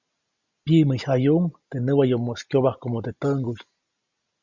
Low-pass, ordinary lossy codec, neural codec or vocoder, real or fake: 7.2 kHz; Opus, 64 kbps; none; real